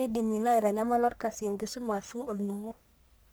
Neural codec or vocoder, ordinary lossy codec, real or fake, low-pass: codec, 44.1 kHz, 1.7 kbps, Pupu-Codec; none; fake; none